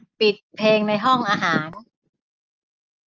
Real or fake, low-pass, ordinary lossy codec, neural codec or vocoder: real; 7.2 kHz; Opus, 24 kbps; none